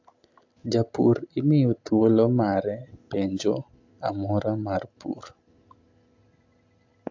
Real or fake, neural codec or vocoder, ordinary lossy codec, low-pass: real; none; AAC, 48 kbps; 7.2 kHz